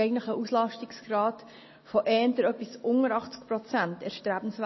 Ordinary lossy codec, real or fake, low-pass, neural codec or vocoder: MP3, 24 kbps; real; 7.2 kHz; none